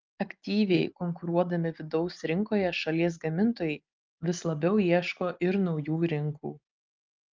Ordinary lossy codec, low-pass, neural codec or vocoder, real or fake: Opus, 24 kbps; 7.2 kHz; none; real